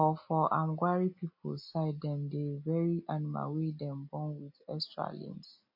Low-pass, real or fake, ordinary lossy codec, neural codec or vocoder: 5.4 kHz; real; MP3, 32 kbps; none